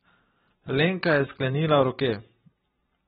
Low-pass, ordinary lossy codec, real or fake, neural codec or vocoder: 19.8 kHz; AAC, 16 kbps; real; none